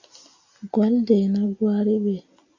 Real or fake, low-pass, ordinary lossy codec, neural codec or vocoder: real; 7.2 kHz; AAC, 48 kbps; none